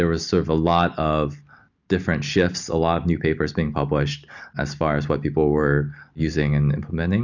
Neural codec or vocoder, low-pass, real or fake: none; 7.2 kHz; real